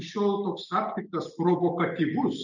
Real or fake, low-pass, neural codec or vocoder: real; 7.2 kHz; none